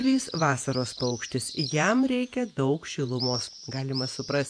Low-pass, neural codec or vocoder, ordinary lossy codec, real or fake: 9.9 kHz; vocoder, 48 kHz, 128 mel bands, Vocos; AAC, 64 kbps; fake